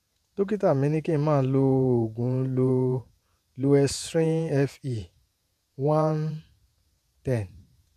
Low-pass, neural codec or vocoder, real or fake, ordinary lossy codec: 14.4 kHz; vocoder, 48 kHz, 128 mel bands, Vocos; fake; none